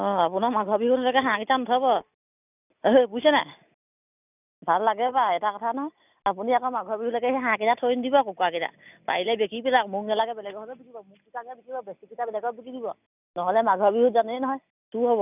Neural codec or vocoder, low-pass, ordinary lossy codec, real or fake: none; 3.6 kHz; none; real